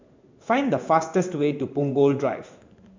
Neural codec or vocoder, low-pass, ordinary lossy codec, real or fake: codec, 16 kHz in and 24 kHz out, 1 kbps, XY-Tokenizer; 7.2 kHz; MP3, 64 kbps; fake